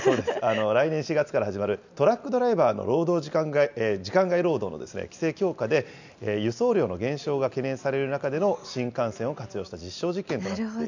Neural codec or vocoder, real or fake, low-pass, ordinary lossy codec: none; real; 7.2 kHz; none